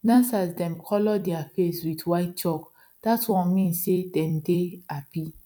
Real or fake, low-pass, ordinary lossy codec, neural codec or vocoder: fake; 19.8 kHz; none; vocoder, 44.1 kHz, 128 mel bands every 256 samples, BigVGAN v2